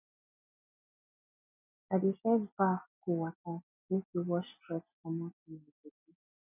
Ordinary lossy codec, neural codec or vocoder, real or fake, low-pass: none; none; real; 3.6 kHz